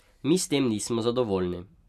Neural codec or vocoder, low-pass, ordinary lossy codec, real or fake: none; 14.4 kHz; none; real